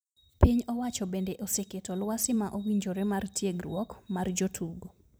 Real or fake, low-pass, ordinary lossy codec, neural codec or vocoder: fake; none; none; vocoder, 44.1 kHz, 128 mel bands every 256 samples, BigVGAN v2